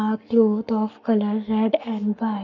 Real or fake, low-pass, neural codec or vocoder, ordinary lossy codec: fake; 7.2 kHz; codec, 44.1 kHz, 7.8 kbps, Pupu-Codec; none